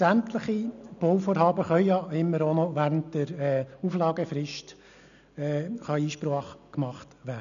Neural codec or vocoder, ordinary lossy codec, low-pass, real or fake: none; none; 7.2 kHz; real